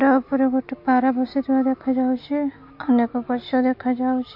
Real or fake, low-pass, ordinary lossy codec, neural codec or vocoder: real; 5.4 kHz; MP3, 32 kbps; none